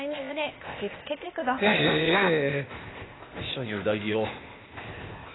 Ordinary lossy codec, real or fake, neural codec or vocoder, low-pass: AAC, 16 kbps; fake; codec, 16 kHz, 0.8 kbps, ZipCodec; 7.2 kHz